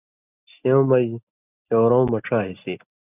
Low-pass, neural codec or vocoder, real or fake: 3.6 kHz; none; real